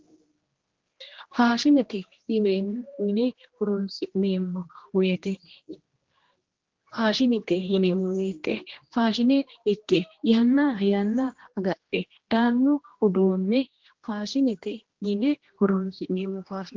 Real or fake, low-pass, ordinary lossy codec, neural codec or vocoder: fake; 7.2 kHz; Opus, 16 kbps; codec, 16 kHz, 1 kbps, X-Codec, HuBERT features, trained on general audio